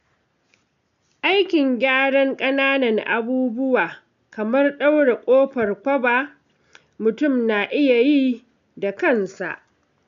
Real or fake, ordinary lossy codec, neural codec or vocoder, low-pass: real; none; none; 7.2 kHz